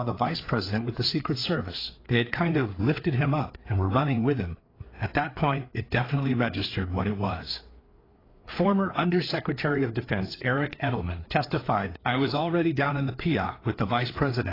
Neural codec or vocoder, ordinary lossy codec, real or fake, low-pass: codec, 16 kHz, 4 kbps, FreqCodec, larger model; AAC, 24 kbps; fake; 5.4 kHz